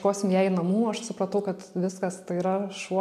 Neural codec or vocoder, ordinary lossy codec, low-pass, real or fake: none; AAC, 96 kbps; 14.4 kHz; real